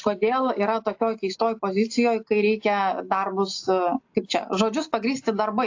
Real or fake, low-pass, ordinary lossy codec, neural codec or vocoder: real; 7.2 kHz; AAC, 48 kbps; none